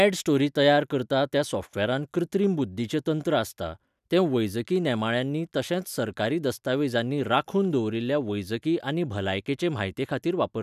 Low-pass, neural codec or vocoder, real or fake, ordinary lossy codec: 14.4 kHz; none; real; none